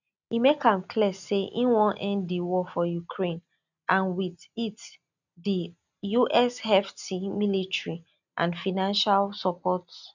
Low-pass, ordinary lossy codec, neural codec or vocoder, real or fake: 7.2 kHz; none; none; real